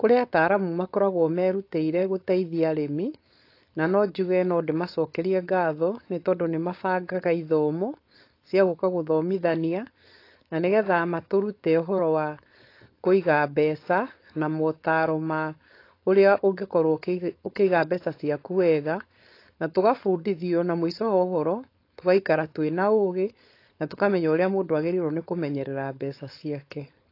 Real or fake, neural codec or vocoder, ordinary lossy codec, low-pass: fake; codec, 16 kHz, 4.8 kbps, FACodec; AAC, 32 kbps; 5.4 kHz